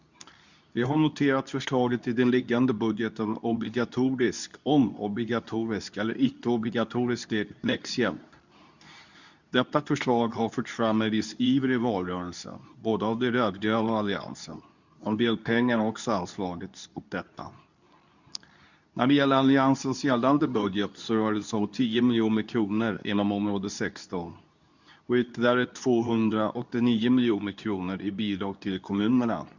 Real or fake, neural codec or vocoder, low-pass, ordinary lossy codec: fake; codec, 24 kHz, 0.9 kbps, WavTokenizer, medium speech release version 2; 7.2 kHz; none